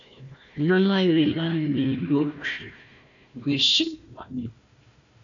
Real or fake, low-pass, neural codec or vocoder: fake; 7.2 kHz; codec, 16 kHz, 1 kbps, FunCodec, trained on Chinese and English, 50 frames a second